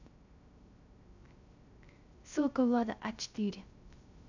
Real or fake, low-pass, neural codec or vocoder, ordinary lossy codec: fake; 7.2 kHz; codec, 16 kHz, 0.3 kbps, FocalCodec; none